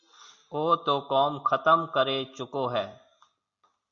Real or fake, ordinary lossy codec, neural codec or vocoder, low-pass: real; Opus, 64 kbps; none; 7.2 kHz